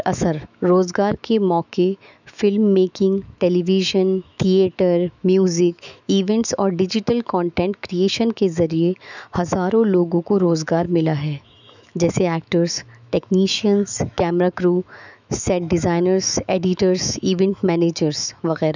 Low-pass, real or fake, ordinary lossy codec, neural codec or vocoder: 7.2 kHz; fake; none; autoencoder, 48 kHz, 128 numbers a frame, DAC-VAE, trained on Japanese speech